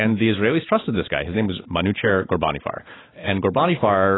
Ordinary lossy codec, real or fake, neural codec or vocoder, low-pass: AAC, 16 kbps; real; none; 7.2 kHz